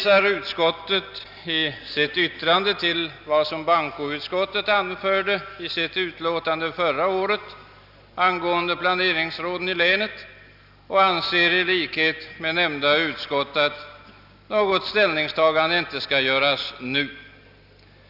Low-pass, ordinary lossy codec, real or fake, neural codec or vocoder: 5.4 kHz; none; real; none